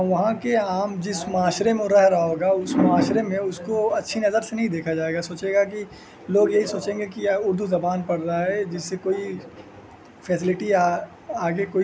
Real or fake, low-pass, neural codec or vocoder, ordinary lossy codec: real; none; none; none